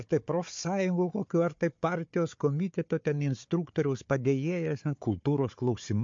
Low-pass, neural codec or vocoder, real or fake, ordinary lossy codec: 7.2 kHz; codec, 16 kHz, 4 kbps, FunCodec, trained on Chinese and English, 50 frames a second; fake; MP3, 48 kbps